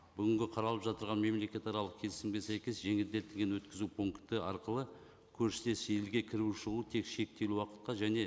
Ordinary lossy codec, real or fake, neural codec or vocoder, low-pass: none; real; none; none